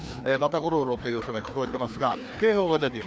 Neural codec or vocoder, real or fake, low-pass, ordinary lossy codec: codec, 16 kHz, 2 kbps, FreqCodec, larger model; fake; none; none